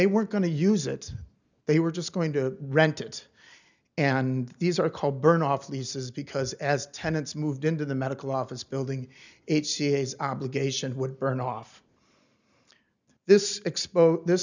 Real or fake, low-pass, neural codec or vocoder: real; 7.2 kHz; none